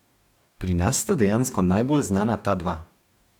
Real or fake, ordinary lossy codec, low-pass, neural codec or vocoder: fake; none; 19.8 kHz; codec, 44.1 kHz, 2.6 kbps, DAC